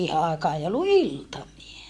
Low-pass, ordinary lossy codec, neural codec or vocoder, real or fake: none; none; vocoder, 24 kHz, 100 mel bands, Vocos; fake